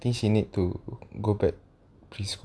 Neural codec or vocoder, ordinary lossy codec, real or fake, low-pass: none; none; real; none